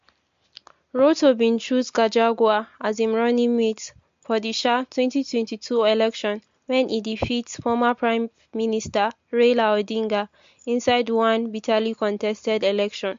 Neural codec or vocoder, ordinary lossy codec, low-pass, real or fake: none; MP3, 48 kbps; 7.2 kHz; real